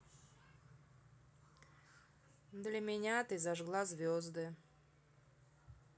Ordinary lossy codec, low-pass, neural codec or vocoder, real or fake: none; none; none; real